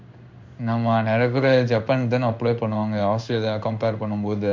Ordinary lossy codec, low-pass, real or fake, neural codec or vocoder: MP3, 64 kbps; 7.2 kHz; fake; codec, 16 kHz in and 24 kHz out, 1 kbps, XY-Tokenizer